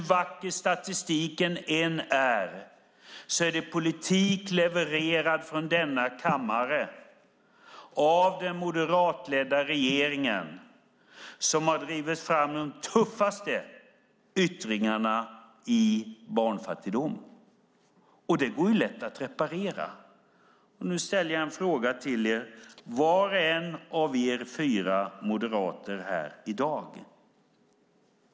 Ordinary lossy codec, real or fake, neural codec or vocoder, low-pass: none; real; none; none